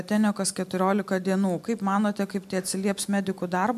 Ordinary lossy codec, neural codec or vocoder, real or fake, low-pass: MP3, 96 kbps; none; real; 14.4 kHz